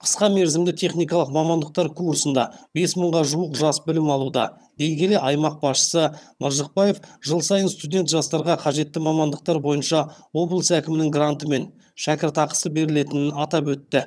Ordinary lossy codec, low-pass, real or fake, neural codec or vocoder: none; none; fake; vocoder, 22.05 kHz, 80 mel bands, HiFi-GAN